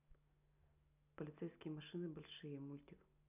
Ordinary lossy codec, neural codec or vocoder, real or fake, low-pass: none; none; real; 3.6 kHz